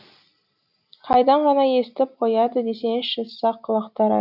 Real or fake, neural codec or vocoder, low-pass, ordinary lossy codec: real; none; 5.4 kHz; none